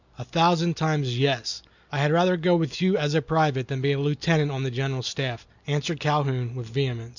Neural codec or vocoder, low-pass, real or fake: none; 7.2 kHz; real